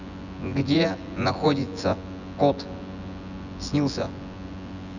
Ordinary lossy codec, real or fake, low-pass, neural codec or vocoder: none; fake; 7.2 kHz; vocoder, 24 kHz, 100 mel bands, Vocos